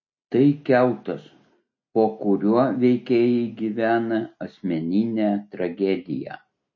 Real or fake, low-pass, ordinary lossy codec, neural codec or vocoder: real; 7.2 kHz; MP3, 32 kbps; none